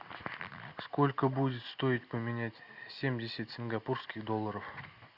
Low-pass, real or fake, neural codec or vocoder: 5.4 kHz; real; none